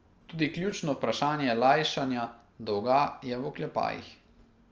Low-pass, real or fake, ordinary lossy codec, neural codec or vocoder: 7.2 kHz; real; Opus, 24 kbps; none